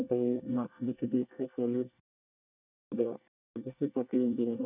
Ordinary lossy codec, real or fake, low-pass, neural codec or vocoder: none; fake; 3.6 kHz; codec, 24 kHz, 1 kbps, SNAC